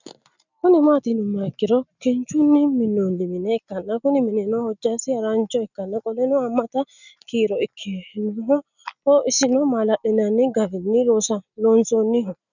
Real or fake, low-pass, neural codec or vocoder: real; 7.2 kHz; none